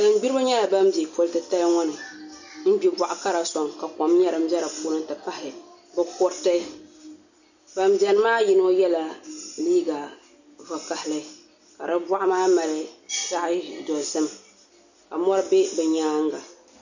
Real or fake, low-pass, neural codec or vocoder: real; 7.2 kHz; none